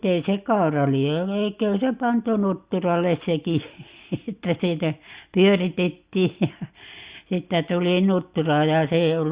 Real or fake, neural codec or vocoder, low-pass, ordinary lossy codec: real; none; 3.6 kHz; Opus, 64 kbps